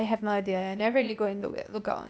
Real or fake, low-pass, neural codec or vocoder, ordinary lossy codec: fake; none; codec, 16 kHz, 0.8 kbps, ZipCodec; none